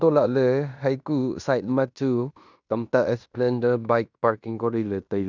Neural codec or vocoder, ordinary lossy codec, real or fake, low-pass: codec, 16 kHz in and 24 kHz out, 0.9 kbps, LongCat-Audio-Codec, fine tuned four codebook decoder; none; fake; 7.2 kHz